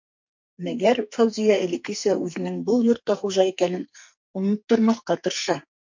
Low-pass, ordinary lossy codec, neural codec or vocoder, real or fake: 7.2 kHz; MP3, 48 kbps; codec, 44.1 kHz, 2.6 kbps, SNAC; fake